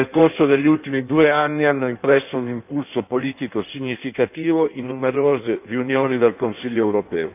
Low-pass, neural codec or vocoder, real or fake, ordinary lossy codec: 3.6 kHz; codec, 16 kHz in and 24 kHz out, 1.1 kbps, FireRedTTS-2 codec; fake; none